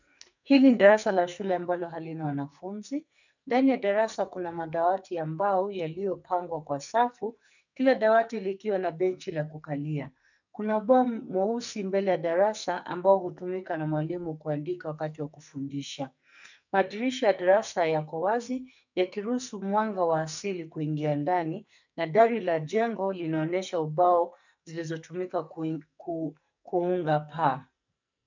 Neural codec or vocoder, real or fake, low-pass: codec, 44.1 kHz, 2.6 kbps, SNAC; fake; 7.2 kHz